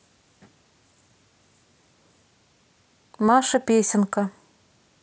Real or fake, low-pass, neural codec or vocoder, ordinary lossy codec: real; none; none; none